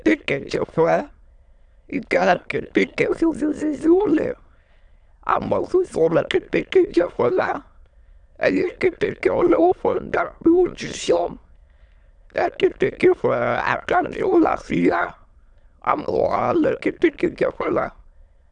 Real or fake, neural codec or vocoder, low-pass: fake; autoencoder, 22.05 kHz, a latent of 192 numbers a frame, VITS, trained on many speakers; 9.9 kHz